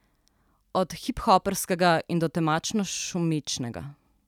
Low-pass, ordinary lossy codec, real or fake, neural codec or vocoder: 19.8 kHz; none; real; none